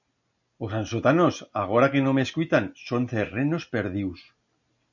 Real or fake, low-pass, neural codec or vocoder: real; 7.2 kHz; none